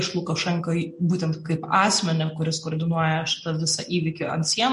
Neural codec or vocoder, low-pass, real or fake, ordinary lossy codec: none; 14.4 kHz; real; MP3, 48 kbps